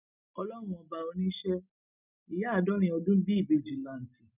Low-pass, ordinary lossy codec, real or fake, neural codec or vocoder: 3.6 kHz; none; real; none